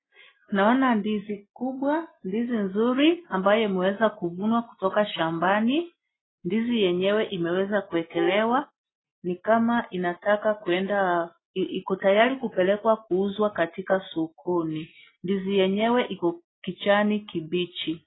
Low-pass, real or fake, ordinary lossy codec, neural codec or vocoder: 7.2 kHz; real; AAC, 16 kbps; none